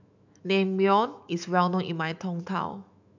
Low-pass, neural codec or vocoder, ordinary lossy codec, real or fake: 7.2 kHz; autoencoder, 48 kHz, 128 numbers a frame, DAC-VAE, trained on Japanese speech; none; fake